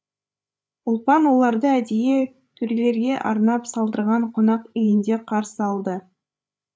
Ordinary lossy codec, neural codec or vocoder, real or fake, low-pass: none; codec, 16 kHz, 8 kbps, FreqCodec, larger model; fake; none